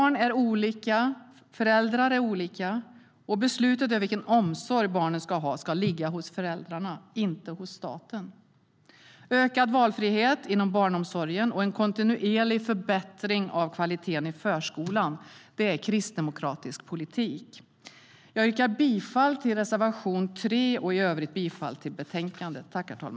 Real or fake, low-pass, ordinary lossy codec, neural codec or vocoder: real; none; none; none